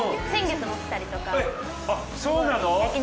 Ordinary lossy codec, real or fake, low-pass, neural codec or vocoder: none; real; none; none